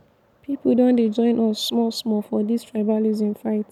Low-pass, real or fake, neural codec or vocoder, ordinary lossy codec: 19.8 kHz; real; none; none